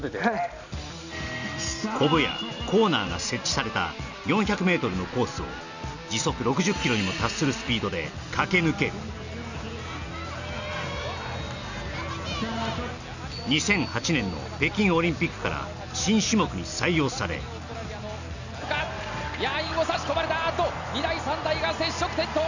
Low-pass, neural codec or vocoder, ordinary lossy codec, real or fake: 7.2 kHz; none; none; real